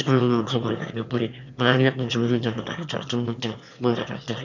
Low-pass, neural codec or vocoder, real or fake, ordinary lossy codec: 7.2 kHz; autoencoder, 22.05 kHz, a latent of 192 numbers a frame, VITS, trained on one speaker; fake; none